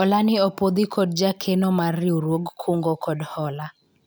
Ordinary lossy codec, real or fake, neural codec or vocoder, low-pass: none; real; none; none